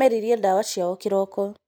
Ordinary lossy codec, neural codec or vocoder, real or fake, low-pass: none; none; real; none